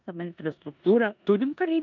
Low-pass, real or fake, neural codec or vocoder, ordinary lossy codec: 7.2 kHz; fake; codec, 16 kHz in and 24 kHz out, 0.9 kbps, LongCat-Audio-Codec, four codebook decoder; MP3, 64 kbps